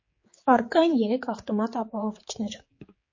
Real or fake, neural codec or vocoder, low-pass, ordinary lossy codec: fake; codec, 16 kHz, 4 kbps, X-Codec, HuBERT features, trained on general audio; 7.2 kHz; MP3, 32 kbps